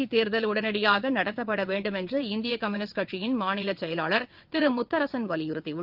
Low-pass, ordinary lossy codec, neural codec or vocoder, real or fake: 5.4 kHz; Opus, 32 kbps; vocoder, 22.05 kHz, 80 mel bands, WaveNeXt; fake